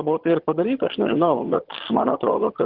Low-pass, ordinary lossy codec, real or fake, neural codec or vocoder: 5.4 kHz; Opus, 16 kbps; fake; vocoder, 22.05 kHz, 80 mel bands, HiFi-GAN